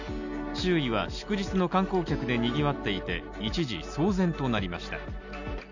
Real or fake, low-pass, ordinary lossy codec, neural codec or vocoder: real; 7.2 kHz; none; none